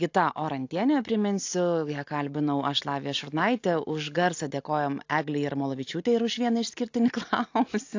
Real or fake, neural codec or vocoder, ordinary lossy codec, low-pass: real; none; AAC, 48 kbps; 7.2 kHz